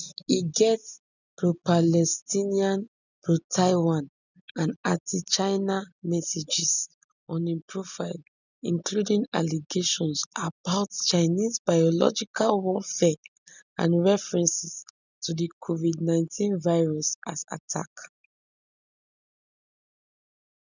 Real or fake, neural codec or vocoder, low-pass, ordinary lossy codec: real; none; 7.2 kHz; none